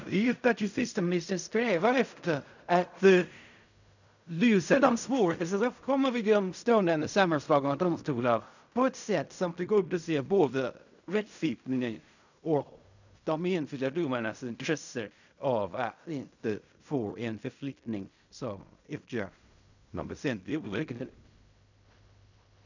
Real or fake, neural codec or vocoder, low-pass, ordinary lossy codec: fake; codec, 16 kHz in and 24 kHz out, 0.4 kbps, LongCat-Audio-Codec, fine tuned four codebook decoder; 7.2 kHz; none